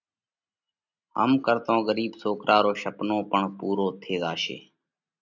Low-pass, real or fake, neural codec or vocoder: 7.2 kHz; real; none